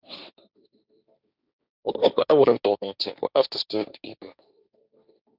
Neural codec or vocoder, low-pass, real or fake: codec, 16 kHz, 1.1 kbps, Voila-Tokenizer; 5.4 kHz; fake